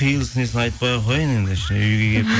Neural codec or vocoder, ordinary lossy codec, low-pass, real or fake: none; none; none; real